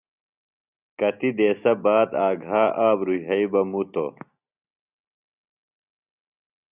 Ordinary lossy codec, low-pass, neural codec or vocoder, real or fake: Opus, 64 kbps; 3.6 kHz; none; real